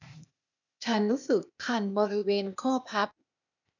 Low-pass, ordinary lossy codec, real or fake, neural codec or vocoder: 7.2 kHz; none; fake; codec, 16 kHz, 0.8 kbps, ZipCodec